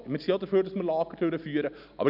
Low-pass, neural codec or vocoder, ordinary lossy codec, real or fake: 5.4 kHz; none; none; real